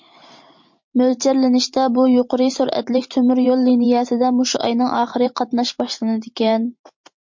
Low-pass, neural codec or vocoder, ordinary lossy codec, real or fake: 7.2 kHz; vocoder, 44.1 kHz, 128 mel bands every 512 samples, BigVGAN v2; MP3, 48 kbps; fake